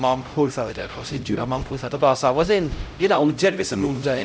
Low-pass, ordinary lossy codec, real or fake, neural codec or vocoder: none; none; fake; codec, 16 kHz, 0.5 kbps, X-Codec, HuBERT features, trained on LibriSpeech